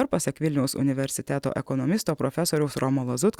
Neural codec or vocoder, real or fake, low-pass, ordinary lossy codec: none; real; 19.8 kHz; Opus, 64 kbps